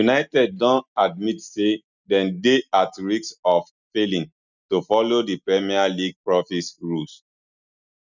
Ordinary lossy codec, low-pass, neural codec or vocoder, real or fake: none; 7.2 kHz; none; real